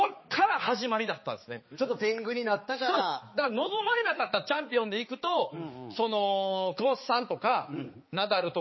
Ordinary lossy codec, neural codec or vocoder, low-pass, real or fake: MP3, 24 kbps; codec, 16 kHz, 4 kbps, X-Codec, HuBERT features, trained on balanced general audio; 7.2 kHz; fake